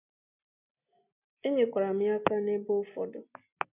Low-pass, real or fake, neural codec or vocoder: 3.6 kHz; real; none